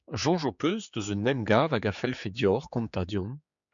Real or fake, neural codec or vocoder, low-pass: fake; codec, 16 kHz, 4 kbps, X-Codec, HuBERT features, trained on general audio; 7.2 kHz